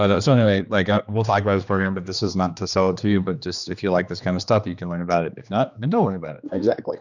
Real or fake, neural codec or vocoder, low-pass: fake; codec, 16 kHz, 2 kbps, X-Codec, HuBERT features, trained on general audio; 7.2 kHz